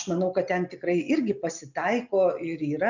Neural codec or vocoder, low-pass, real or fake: none; 7.2 kHz; real